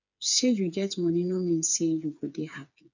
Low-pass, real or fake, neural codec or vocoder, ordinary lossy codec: 7.2 kHz; fake; codec, 16 kHz, 4 kbps, FreqCodec, smaller model; none